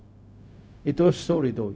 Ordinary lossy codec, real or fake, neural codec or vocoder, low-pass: none; fake; codec, 16 kHz, 0.4 kbps, LongCat-Audio-Codec; none